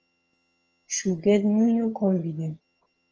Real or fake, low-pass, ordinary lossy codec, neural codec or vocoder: fake; 7.2 kHz; Opus, 24 kbps; vocoder, 22.05 kHz, 80 mel bands, HiFi-GAN